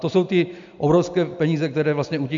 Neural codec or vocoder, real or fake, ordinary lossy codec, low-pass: none; real; MP3, 64 kbps; 7.2 kHz